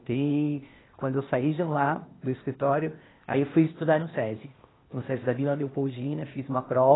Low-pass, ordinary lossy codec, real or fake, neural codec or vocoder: 7.2 kHz; AAC, 16 kbps; fake; codec, 16 kHz, 0.8 kbps, ZipCodec